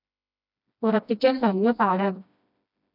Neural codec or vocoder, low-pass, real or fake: codec, 16 kHz, 1 kbps, FreqCodec, smaller model; 5.4 kHz; fake